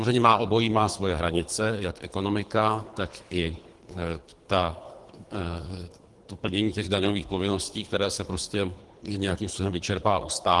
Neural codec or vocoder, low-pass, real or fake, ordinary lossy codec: codec, 24 kHz, 3 kbps, HILCodec; 10.8 kHz; fake; Opus, 24 kbps